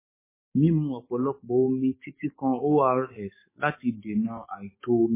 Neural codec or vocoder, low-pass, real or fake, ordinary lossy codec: codec, 44.1 kHz, 7.8 kbps, DAC; 3.6 kHz; fake; MP3, 16 kbps